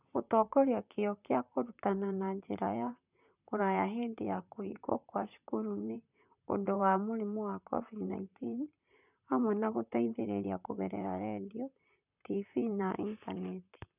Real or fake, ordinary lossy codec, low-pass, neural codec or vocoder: fake; none; 3.6 kHz; vocoder, 44.1 kHz, 128 mel bands, Pupu-Vocoder